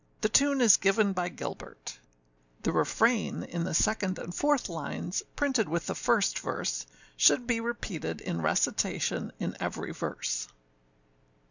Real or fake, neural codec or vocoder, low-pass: real; none; 7.2 kHz